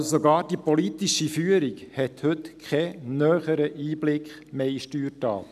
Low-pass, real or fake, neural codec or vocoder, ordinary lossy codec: 14.4 kHz; real; none; none